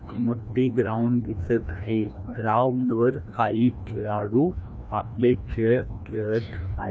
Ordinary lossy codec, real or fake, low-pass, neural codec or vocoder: none; fake; none; codec, 16 kHz, 1 kbps, FreqCodec, larger model